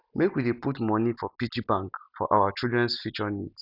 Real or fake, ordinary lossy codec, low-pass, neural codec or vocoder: real; none; 5.4 kHz; none